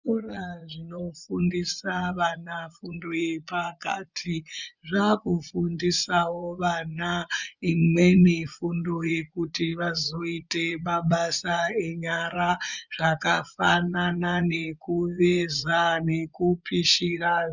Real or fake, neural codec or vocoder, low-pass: fake; vocoder, 24 kHz, 100 mel bands, Vocos; 7.2 kHz